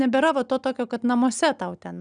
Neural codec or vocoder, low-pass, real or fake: none; 9.9 kHz; real